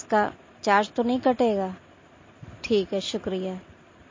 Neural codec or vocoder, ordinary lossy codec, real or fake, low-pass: none; MP3, 32 kbps; real; 7.2 kHz